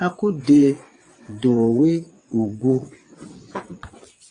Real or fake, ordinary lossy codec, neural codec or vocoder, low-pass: fake; AAC, 48 kbps; vocoder, 22.05 kHz, 80 mel bands, WaveNeXt; 9.9 kHz